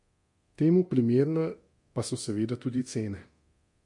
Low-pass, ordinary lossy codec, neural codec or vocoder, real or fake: 10.8 kHz; MP3, 48 kbps; codec, 24 kHz, 0.9 kbps, DualCodec; fake